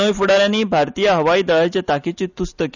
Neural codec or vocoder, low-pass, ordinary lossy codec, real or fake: none; 7.2 kHz; none; real